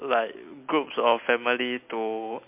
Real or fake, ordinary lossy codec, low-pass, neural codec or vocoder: real; MP3, 32 kbps; 3.6 kHz; none